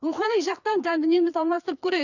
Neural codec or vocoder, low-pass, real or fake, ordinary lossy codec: codec, 16 kHz, 2 kbps, FreqCodec, larger model; 7.2 kHz; fake; none